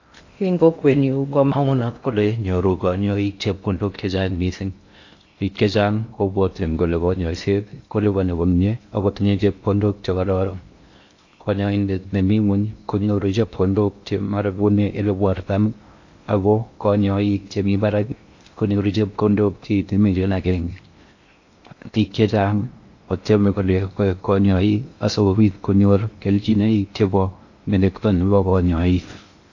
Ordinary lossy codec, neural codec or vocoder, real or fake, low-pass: AAC, 48 kbps; codec, 16 kHz in and 24 kHz out, 0.6 kbps, FocalCodec, streaming, 2048 codes; fake; 7.2 kHz